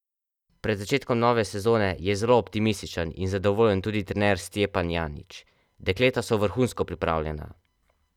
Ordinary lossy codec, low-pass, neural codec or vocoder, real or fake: none; 19.8 kHz; none; real